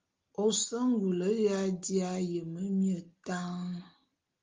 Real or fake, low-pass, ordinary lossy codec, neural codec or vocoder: real; 7.2 kHz; Opus, 32 kbps; none